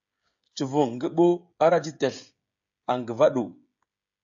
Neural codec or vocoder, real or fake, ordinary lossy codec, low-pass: codec, 16 kHz, 16 kbps, FreqCodec, smaller model; fake; AAC, 64 kbps; 7.2 kHz